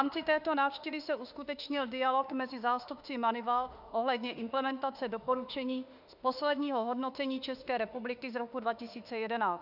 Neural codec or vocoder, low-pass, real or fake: autoencoder, 48 kHz, 32 numbers a frame, DAC-VAE, trained on Japanese speech; 5.4 kHz; fake